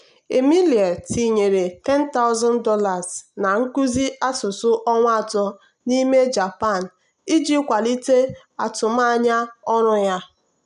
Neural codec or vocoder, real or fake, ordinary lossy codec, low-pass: none; real; none; 10.8 kHz